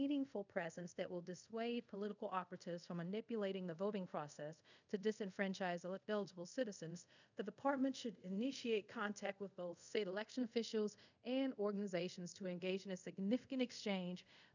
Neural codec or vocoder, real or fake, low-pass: codec, 24 kHz, 0.5 kbps, DualCodec; fake; 7.2 kHz